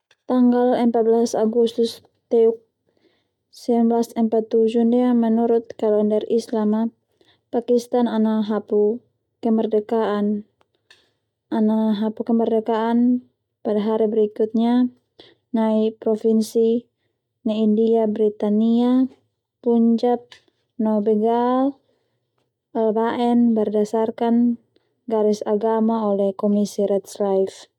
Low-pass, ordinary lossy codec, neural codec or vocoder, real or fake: 19.8 kHz; none; none; real